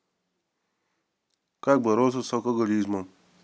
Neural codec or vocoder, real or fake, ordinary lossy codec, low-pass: none; real; none; none